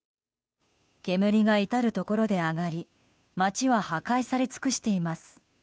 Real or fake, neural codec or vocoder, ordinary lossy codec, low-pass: fake; codec, 16 kHz, 2 kbps, FunCodec, trained on Chinese and English, 25 frames a second; none; none